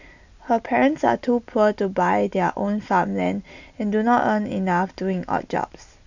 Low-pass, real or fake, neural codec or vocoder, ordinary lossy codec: 7.2 kHz; real; none; none